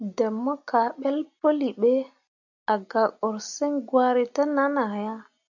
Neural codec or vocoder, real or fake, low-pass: none; real; 7.2 kHz